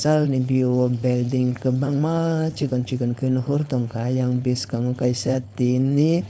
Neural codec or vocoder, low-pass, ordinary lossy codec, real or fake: codec, 16 kHz, 4.8 kbps, FACodec; none; none; fake